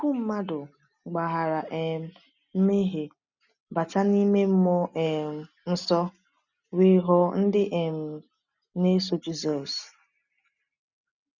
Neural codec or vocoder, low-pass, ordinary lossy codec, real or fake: none; 7.2 kHz; none; real